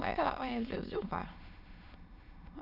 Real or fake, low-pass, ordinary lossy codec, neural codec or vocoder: fake; 5.4 kHz; none; autoencoder, 22.05 kHz, a latent of 192 numbers a frame, VITS, trained on many speakers